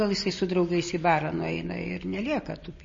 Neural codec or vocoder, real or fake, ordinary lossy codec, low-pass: none; real; MP3, 32 kbps; 7.2 kHz